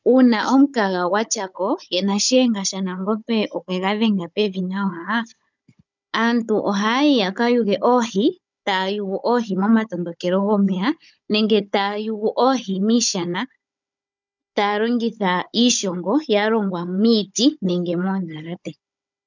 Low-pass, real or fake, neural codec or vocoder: 7.2 kHz; fake; codec, 16 kHz, 16 kbps, FunCodec, trained on Chinese and English, 50 frames a second